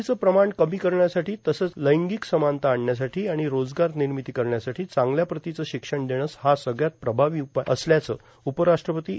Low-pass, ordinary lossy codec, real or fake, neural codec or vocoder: none; none; real; none